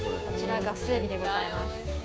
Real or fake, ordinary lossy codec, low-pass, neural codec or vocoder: fake; none; none; codec, 16 kHz, 6 kbps, DAC